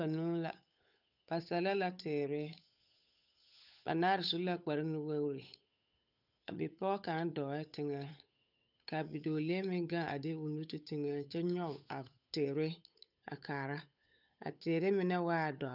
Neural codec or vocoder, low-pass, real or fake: codec, 16 kHz, 8 kbps, FunCodec, trained on Chinese and English, 25 frames a second; 5.4 kHz; fake